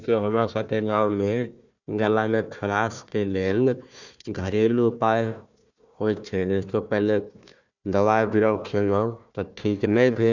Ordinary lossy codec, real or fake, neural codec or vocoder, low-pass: none; fake; codec, 16 kHz, 1 kbps, FunCodec, trained on Chinese and English, 50 frames a second; 7.2 kHz